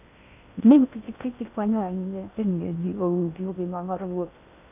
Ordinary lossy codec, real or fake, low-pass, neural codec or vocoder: none; fake; 3.6 kHz; codec, 16 kHz in and 24 kHz out, 0.6 kbps, FocalCodec, streaming, 2048 codes